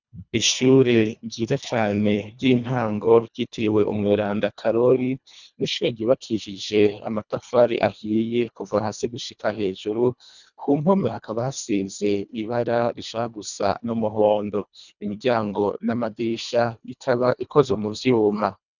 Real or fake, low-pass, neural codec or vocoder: fake; 7.2 kHz; codec, 24 kHz, 1.5 kbps, HILCodec